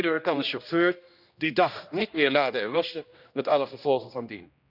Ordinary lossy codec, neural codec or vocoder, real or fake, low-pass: none; codec, 16 kHz, 1 kbps, X-Codec, HuBERT features, trained on general audio; fake; 5.4 kHz